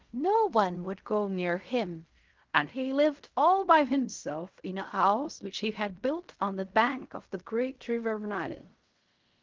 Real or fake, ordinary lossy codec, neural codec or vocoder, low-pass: fake; Opus, 32 kbps; codec, 16 kHz in and 24 kHz out, 0.4 kbps, LongCat-Audio-Codec, fine tuned four codebook decoder; 7.2 kHz